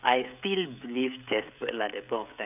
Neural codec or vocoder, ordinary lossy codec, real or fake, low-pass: codec, 16 kHz, 16 kbps, FreqCodec, smaller model; none; fake; 3.6 kHz